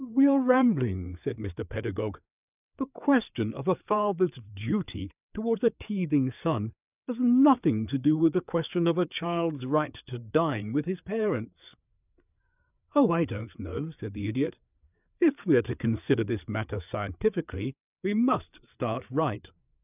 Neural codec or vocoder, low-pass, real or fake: codec, 16 kHz, 4 kbps, FreqCodec, larger model; 3.6 kHz; fake